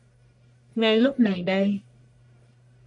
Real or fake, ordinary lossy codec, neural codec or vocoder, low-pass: fake; AAC, 64 kbps; codec, 44.1 kHz, 1.7 kbps, Pupu-Codec; 10.8 kHz